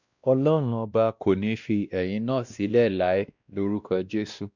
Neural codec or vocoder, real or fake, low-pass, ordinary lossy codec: codec, 16 kHz, 1 kbps, X-Codec, WavLM features, trained on Multilingual LibriSpeech; fake; 7.2 kHz; none